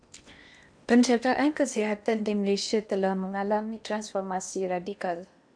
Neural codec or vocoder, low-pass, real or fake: codec, 16 kHz in and 24 kHz out, 0.8 kbps, FocalCodec, streaming, 65536 codes; 9.9 kHz; fake